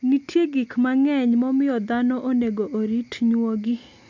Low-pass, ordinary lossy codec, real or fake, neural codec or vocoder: 7.2 kHz; MP3, 64 kbps; real; none